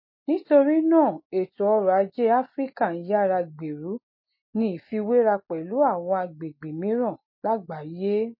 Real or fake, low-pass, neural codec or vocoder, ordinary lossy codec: real; 5.4 kHz; none; MP3, 24 kbps